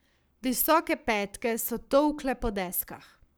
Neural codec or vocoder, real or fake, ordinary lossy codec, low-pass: codec, 44.1 kHz, 7.8 kbps, Pupu-Codec; fake; none; none